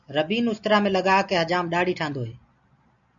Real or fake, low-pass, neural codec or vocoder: real; 7.2 kHz; none